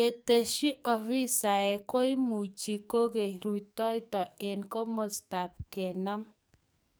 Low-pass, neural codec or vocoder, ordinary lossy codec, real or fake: none; codec, 44.1 kHz, 2.6 kbps, SNAC; none; fake